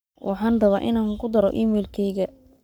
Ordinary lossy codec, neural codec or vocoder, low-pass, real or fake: none; codec, 44.1 kHz, 7.8 kbps, Pupu-Codec; none; fake